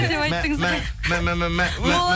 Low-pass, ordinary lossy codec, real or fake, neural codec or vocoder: none; none; real; none